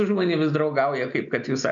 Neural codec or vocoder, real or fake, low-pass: none; real; 7.2 kHz